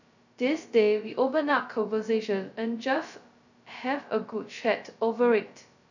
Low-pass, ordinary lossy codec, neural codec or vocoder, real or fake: 7.2 kHz; none; codec, 16 kHz, 0.2 kbps, FocalCodec; fake